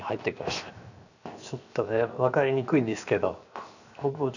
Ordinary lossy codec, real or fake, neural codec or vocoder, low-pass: none; fake; codec, 16 kHz, 0.7 kbps, FocalCodec; 7.2 kHz